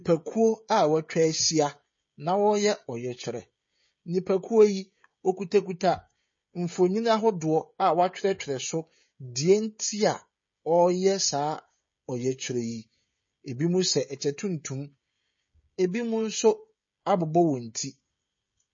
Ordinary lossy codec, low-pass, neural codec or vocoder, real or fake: MP3, 32 kbps; 7.2 kHz; codec, 16 kHz, 16 kbps, FreqCodec, smaller model; fake